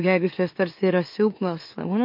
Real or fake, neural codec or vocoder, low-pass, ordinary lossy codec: fake; autoencoder, 44.1 kHz, a latent of 192 numbers a frame, MeloTTS; 5.4 kHz; MP3, 32 kbps